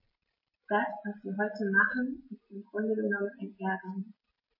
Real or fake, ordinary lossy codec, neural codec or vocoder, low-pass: real; MP3, 24 kbps; none; 5.4 kHz